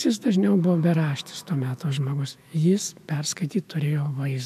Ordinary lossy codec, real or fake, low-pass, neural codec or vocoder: MP3, 96 kbps; fake; 14.4 kHz; autoencoder, 48 kHz, 128 numbers a frame, DAC-VAE, trained on Japanese speech